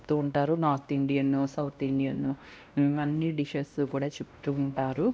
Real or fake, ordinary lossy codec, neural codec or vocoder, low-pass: fake; none; codec, 16 kHz, 1 kbps, X-Codec, WavLM features, trained on Multilingual LibriSpeech; none